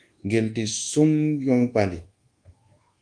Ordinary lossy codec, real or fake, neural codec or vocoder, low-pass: Opus, 24 kbps; fake; codec, 24 kHz, 1.2 kbps, DualCodec; 9.9 kHz